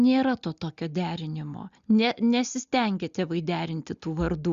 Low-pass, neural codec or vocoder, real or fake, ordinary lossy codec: 7.2 kHz; none; real; Opus, 64 kbps